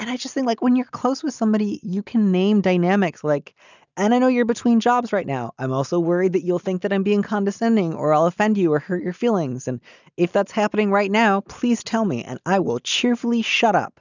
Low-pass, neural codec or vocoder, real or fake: 7.2 kHz; none; real